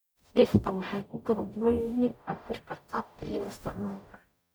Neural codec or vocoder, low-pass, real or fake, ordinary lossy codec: codec, 44.1 kHz, 0.9 kbps, DAC; none; fake; none